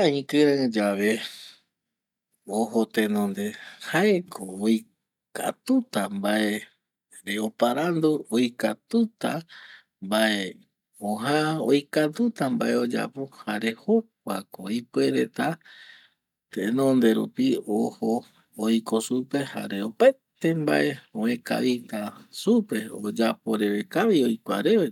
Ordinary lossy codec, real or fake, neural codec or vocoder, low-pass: none; real; none; 19.8 kHz